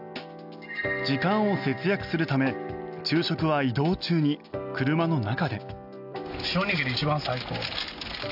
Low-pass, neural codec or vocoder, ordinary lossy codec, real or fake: 5.4 kHz; none; none; real